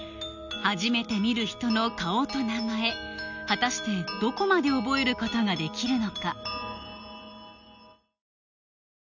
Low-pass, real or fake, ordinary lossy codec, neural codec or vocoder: 7.2 kHz; real; none; none